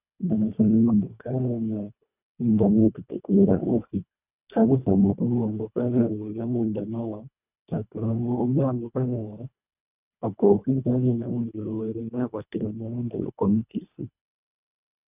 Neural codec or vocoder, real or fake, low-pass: codec, 24 kHz, 1.5 kbps, HILCodec; fake; 3.6 kHz